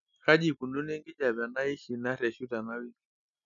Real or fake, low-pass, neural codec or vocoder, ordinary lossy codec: real; 7.2 kHz; none; none